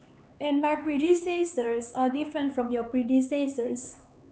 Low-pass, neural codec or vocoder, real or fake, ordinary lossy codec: none; codec, 16 kHz, 4 kbps, X-Codec, HuBERT features, trained on LibriSpeech; fake; none